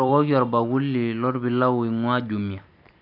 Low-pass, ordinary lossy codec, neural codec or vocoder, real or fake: 5.4 kHz; none; none; real